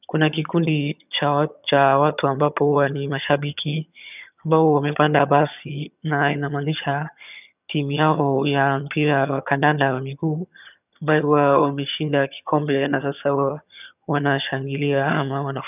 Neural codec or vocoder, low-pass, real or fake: vocoder, 22.05 kHz, 80 mel bands, HiFi-GAN; 3.6 kHz; fake